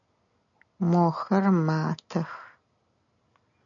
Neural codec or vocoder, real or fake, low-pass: none; real; 7.2 kHz